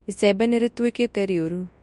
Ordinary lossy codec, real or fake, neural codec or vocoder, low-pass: MP3, 64 kbps; fake; codec, 24 kHz, 0.9 kbps, WavTokenizer, large speech release; 10.8 kHz